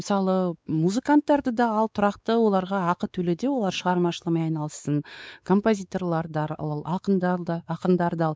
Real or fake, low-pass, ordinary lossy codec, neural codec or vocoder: fake; none; none; codec, 16 kHz, 4 kbps, X-Codec, WavLM features, trained on Multilingual LibriSpeech